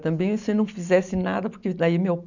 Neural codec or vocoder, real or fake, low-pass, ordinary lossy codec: none; real; 7.2 kHz; none